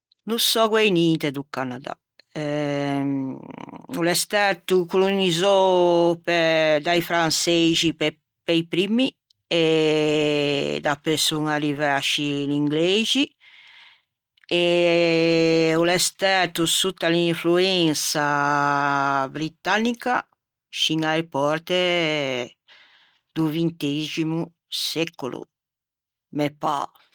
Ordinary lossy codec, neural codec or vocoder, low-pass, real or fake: Opus, 24 kbps; none; 19.8 kHz; real